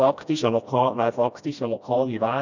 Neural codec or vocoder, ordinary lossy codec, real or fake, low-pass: codec, 16 kHz, 1 kbps, FreqCodec, smaller model; none; fake; 7.2 kHz